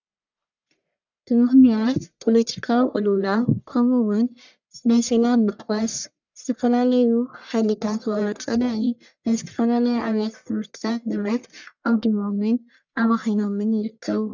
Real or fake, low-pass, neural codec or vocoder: fake; 7.2 kHz; codec, 44.1 kHz, 1.7 kbps, Pupu-Codec